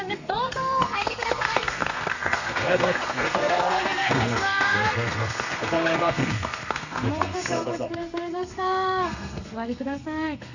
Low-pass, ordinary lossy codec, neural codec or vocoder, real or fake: 7.2 kHz; none; codec, 32 kHz, 1.9 kbps, SNAC; fake